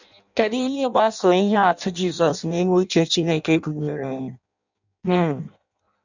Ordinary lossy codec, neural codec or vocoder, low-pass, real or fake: none; codec, 16 kHz in and 24 kHz out, 0.6 kbps, FireRedTTS-2 codec; 7.2 kHz; fake